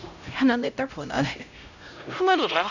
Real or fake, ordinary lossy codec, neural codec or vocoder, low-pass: fake; none; codec, 16 kHz, 0.5 kbps, X-Codec, HuBERT features, trained on LibriSpeech; 7.2 kHz